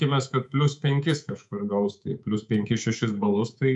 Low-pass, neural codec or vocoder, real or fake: 10.8 kHz; codec, 24 kHz, 3.1 kbps, DualCodec; fake